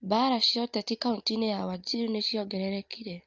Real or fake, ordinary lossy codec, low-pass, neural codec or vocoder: real; Opus, 24 kbps; 7.2 kHz; none